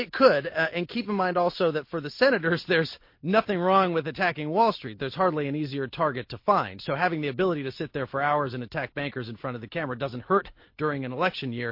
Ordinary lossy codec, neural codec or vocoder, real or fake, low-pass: MP3, 32 kbps; none; real; 5.4 kHz